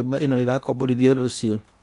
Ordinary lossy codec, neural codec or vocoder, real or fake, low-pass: MP3, 96 kbps; codec, 16 kHz in and 24 kHz out, 0.8 kbps, FocalCodec, streaming, 65536 codes; fake; 10.8 kHz